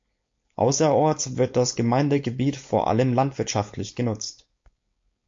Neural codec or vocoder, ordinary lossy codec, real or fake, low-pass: codec, 16 kHz, 4.8 kbps, FACodec; MP3, 48 kbps; fake; 7.2 kHz